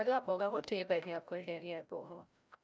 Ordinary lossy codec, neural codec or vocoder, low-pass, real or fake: none; codec, 16 kHz, 0.5 kbps, FreqCodec, larger model; none; fake